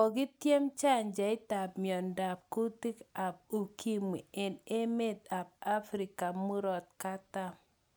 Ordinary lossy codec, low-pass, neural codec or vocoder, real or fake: none; none; none; real